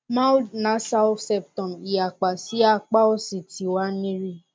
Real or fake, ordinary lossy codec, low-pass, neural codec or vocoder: real; none; none; none